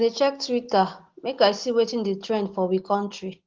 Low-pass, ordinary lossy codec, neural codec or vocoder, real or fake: 7.2 kHz; Opus, 16 kbps; none; real